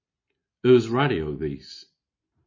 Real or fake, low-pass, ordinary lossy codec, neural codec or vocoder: real; 7.2 kHz; MP3, 32 kbps; none